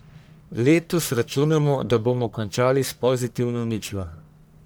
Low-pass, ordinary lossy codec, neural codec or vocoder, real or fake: none; none; codec, 44.1 kHz, 1.7 kbps, Pupu-Codec; fake